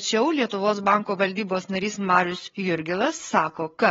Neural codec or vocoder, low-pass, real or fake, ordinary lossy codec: codec, 16 kHz, 8 kbps, FreqCodec, larger model; 7.2 kHz; fake; AAC, 24 kbps